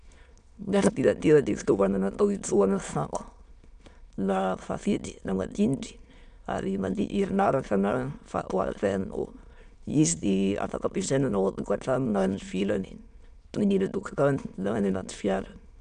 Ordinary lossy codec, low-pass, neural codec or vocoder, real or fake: none; 9.9 kHz; autoencoder, 22.05 kHz, a latent of 192 numbers a frame, VITS, trained on many speakers; fake